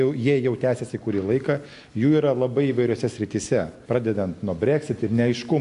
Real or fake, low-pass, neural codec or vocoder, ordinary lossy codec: real; 10.8 kHz; none; AAC, 64 kbps